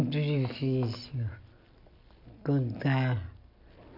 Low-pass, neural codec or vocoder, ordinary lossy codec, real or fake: 5.4 kHz; none; none; real